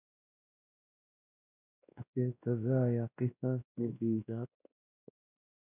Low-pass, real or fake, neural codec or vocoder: 3.6 kHz; fake; codec, 16 kHz, 1 kbps, X-Codec, WavLM features, trained on Multilingual LibriSpeech